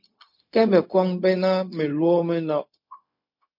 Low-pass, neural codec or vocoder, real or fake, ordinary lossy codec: 5.4 kHz; codec, 16 kHz, 0.4 kbps, LongCat-Audio-Codec; fake; MP3, 32 kbps